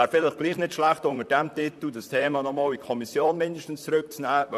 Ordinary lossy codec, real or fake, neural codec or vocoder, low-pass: none; fake; vocoder, 44.1 kHz, 128 mel bands, Pupu-Vocoder; 14.4 kHz